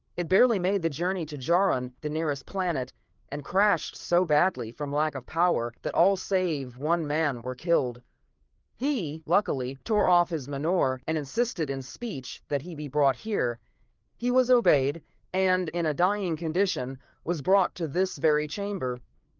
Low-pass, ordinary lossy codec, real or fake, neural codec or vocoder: 7.2 kHz; Opus, 32 kbps; fake; codec, 16 kHz, 4 kbps, FreqCodec, larger model